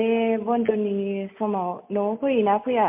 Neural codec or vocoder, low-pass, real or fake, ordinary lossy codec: none; 3.6 kHz; real; MP3, 32 kbps